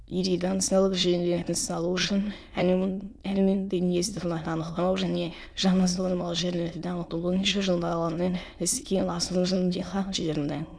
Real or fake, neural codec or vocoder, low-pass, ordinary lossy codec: fake; autoencoder, 22.05 kHz, a latent of 192 numbers a frame, VITS, trained on many speakers; none; none